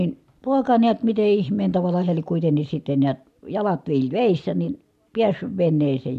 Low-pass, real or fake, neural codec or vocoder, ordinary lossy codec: 14.4 kHz; real; none; none